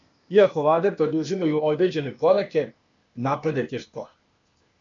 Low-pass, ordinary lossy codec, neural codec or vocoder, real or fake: 7.2 kHz; MP3, 64 kbps; codec, 16 kHz, 0.8 kbps, ZipCodec; fake